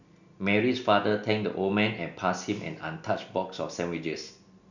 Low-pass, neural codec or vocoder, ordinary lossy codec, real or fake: 7.2 kHz; none; none; real